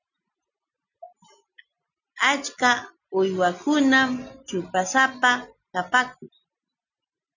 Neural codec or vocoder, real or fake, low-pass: none; real; 7.2 kHz